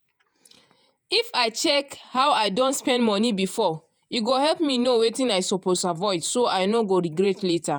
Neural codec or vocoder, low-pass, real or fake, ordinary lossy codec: vocoder, 48 kHz, 128 mel bands, Vocos; none; fake; none